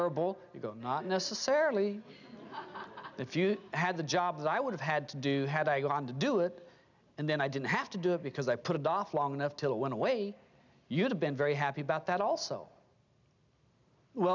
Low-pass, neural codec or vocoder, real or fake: 7.2 kHz; none; real